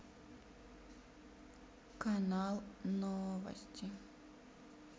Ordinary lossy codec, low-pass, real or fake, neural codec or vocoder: none; none; real; none